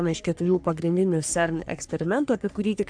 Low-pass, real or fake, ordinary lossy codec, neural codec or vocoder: 9.9 kHz; fake; AAC, 64 kbps; codec, 44.1 kHz, 3.4 kbps, Pupu-Codec